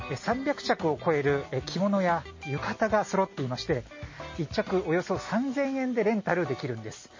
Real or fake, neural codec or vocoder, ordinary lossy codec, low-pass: real; none; MP3, 32 kbps; 7.2 kHz